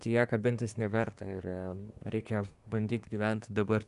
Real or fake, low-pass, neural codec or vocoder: fake; 10.8 kHz; codec, 24 kHz, 1 kbps, SNAC